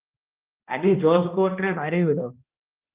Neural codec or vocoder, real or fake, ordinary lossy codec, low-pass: codec, 16 kHz, 1 kbps, X-Codec, HuBERT features, trained on balanced general audio; fake; Opus, 32 kbps; 3.6 kHz